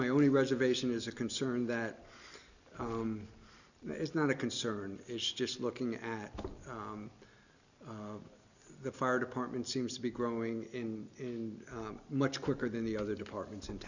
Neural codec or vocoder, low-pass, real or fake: none; 7.2 kHz; real